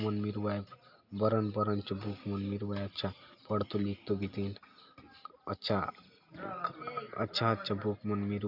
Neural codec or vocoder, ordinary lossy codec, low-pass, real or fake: none; none; 5.4 kHz; real